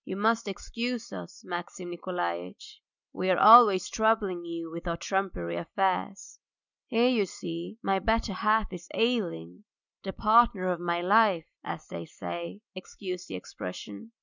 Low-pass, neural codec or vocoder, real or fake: 7.2 kHz; none; real